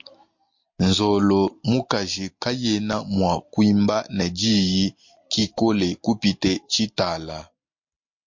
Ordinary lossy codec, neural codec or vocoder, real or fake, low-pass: MP3, 48 kbps; none; real; 7.2 kHz